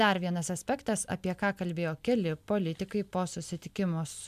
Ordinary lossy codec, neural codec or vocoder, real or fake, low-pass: MP3, 96 kbps; none; real; 14.4 kHz